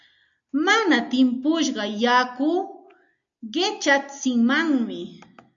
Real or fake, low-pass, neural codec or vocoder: real; 7.2 kHz; none